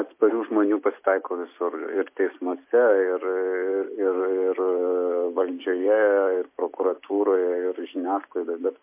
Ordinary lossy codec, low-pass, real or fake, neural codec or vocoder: MP3, 24 kbps; 3.6 kHz; real; none